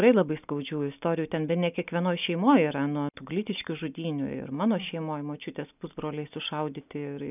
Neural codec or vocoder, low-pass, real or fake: none; 3.6 kHz; real